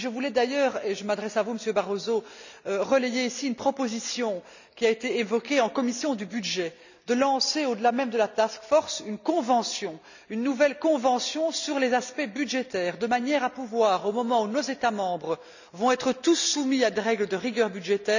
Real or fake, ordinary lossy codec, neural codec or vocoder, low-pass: real; none; none; 7.2 kHz